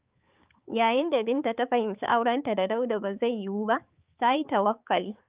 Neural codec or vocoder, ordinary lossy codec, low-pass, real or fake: codec, 16 kHz, 4 kbps, X-Codec, HuBERT features, trained on balanced general audio; Opus, 24 kbps; 3.6 kHz; fake